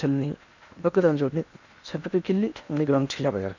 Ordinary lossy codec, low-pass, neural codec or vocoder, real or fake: none; 7.2 kHz; codec, 16 kHz in and 24 kHz out, 0.8 kbps, FocalCodec, streaming, 65536 codes; fake